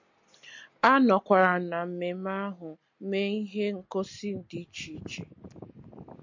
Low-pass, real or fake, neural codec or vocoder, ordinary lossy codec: 7.2 kHz; real; none; MP3, 48 kbps